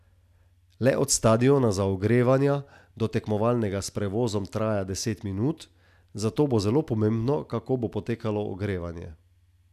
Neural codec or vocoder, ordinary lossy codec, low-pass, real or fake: none; none; 14.4 kHz; real